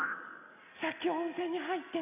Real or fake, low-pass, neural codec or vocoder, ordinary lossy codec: real; 3.6 kHz; none; none